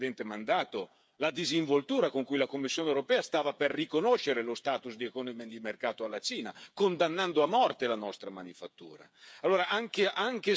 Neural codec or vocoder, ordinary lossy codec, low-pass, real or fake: codec, 16 kHz, 8 kbps, FreqCodec, smaller model; none; none; fake